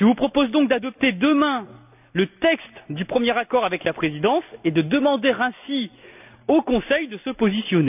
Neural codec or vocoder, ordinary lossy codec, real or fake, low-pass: none; none; real; 3.6 kHz